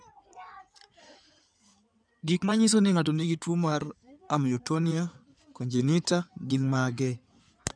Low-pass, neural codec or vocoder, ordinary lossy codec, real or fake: 9.9 kHz; codec, 16 kHz in and 24 kHz out, 2.2 kbps, FireRedTTS-2 codec; none; fake